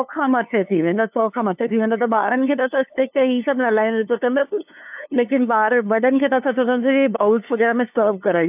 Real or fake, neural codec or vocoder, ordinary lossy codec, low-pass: fake; codec, 16 kHz, 2 kbps, FunCodec, trained on LibriTTS, 25 frames a second; AAC, 32 kbps; 3.6 kHz